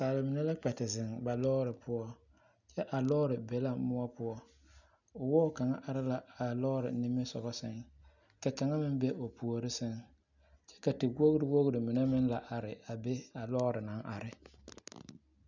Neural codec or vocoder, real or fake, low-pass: none; real; 7.2 kHz